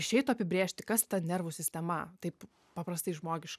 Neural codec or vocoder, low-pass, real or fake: none; 14.4 kHz; real